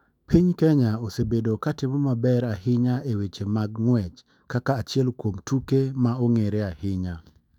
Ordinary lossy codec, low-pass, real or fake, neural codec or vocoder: none; 19.8 kHz; fake; autoencoder, 48 kHz, 128 numbers a frame, DAC-VAE, trained on Japanese speech